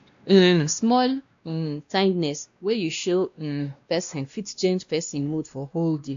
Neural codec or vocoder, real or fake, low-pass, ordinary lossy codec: codec, 16 kHz, 1 kbps, X-Codec, WavLM features, trained on Multilingual LibriSpeech; fake; 7.2 kHz; AAC, 64 kbps